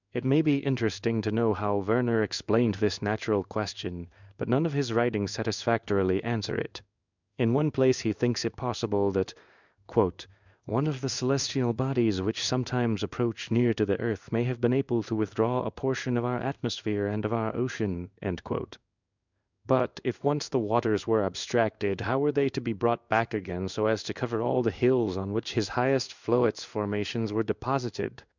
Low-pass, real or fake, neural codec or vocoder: 7.2 kHz; fake; codec, 16 kHz in and 24 kHz out, 1 kbps, XY-Tokenizer